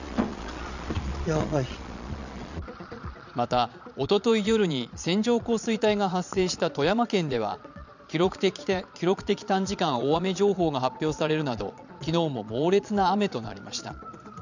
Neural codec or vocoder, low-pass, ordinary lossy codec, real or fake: vocoder, 22.05 kHz, 80 mel bands, Vocos; 7.2 kHz; none; fake